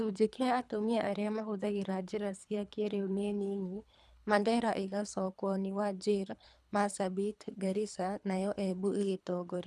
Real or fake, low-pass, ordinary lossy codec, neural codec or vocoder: fake; none; none; codec, 24 kHz, 3 kbps, HILCodec